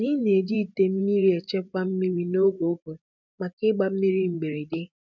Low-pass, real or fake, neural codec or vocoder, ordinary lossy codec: 7.2 kHz; fake; vocoder, 44.1 kHz, 128 mel bands every 512 samples, BigVGAN v2; none